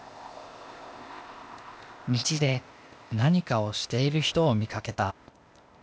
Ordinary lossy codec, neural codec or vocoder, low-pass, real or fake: none; codec, 16 kHz, 0.8 kbps, ZipCodec; none; fake